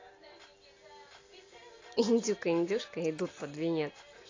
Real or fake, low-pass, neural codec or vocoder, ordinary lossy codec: real; 7.2 kHz; none; AAC, 32 kbps